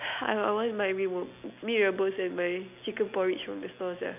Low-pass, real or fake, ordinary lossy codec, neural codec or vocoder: 3.6 kHz; real; none; none